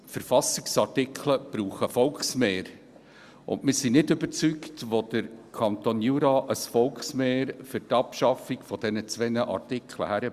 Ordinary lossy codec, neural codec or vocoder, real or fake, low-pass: Opus, 64 kbps; none; real; 14.4 kHz